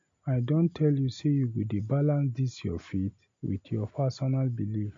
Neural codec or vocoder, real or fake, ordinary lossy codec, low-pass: none; real; MP3, 48 kbps; 7.2 kHz